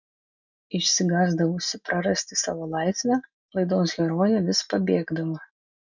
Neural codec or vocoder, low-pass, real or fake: none; 7.2 kHz; real